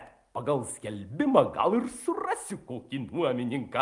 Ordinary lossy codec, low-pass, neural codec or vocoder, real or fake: Opus, 32 kbps; 10.8 kHz; none; real